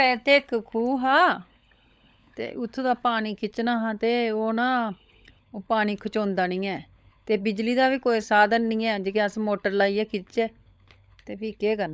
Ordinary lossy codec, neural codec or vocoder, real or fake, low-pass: none; codec, 16 kHz, 16 kbps, FunCodec, trained on LibriTTS, 50 frames a second; fake; none